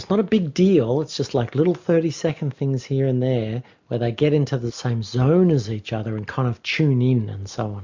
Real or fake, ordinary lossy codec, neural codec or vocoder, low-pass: real; MP3, 64 kbps; none; 7.2 kHz